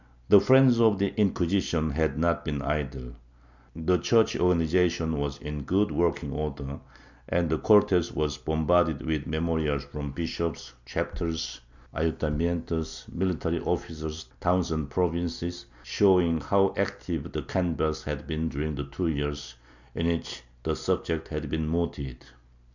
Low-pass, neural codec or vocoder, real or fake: 7.2 kHz; none; real